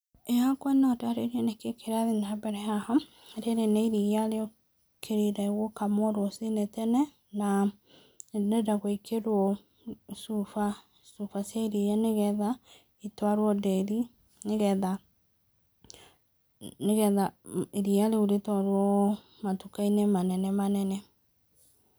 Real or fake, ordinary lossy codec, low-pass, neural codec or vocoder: real; none; none; none